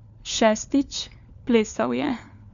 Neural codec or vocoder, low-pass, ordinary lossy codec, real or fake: codec, 16 kHz, 4 kbps, FunCodec, trained on LibriTTS, 50 frames a second; 7.2 kHz; none; fake